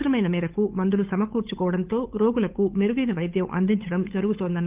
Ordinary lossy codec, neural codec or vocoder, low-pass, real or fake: Opus, 32 kbps; codec, 16 kHz, 8 kbps, FunCodec, trained on Chinese and English, 25 frames a second; 3.6 kHz; fake